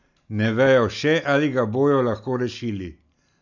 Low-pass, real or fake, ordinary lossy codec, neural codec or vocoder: 7.2 kHz; real; none; none